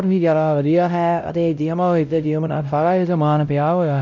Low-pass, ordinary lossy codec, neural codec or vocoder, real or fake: 7.2 kHz; none; codec, 16 kHz, 0.5 kbps, X-Codec, WavLM features, trained on Multilingual LibriSpeech; fake